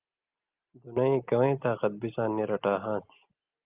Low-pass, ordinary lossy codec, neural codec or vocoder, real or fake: 3.6 kHz; Opus, 32 kbps; none; real